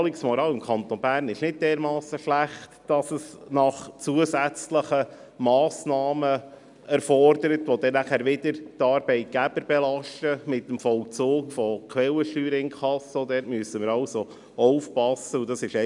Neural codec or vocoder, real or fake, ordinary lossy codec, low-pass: none; real; none; 10.8 kHz